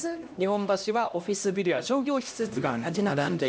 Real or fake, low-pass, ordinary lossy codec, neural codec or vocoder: fake; none; none; codec, 16 kHz, 1 kbps, X-Codec, HuBERT features, trained on LibriSpeech